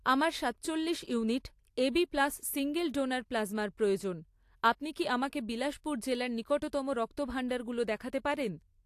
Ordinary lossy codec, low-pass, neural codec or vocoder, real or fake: AAC, 64 kbps; 14.4 kHz; none; real